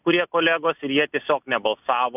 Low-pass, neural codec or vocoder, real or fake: 3.6 kHz; none; real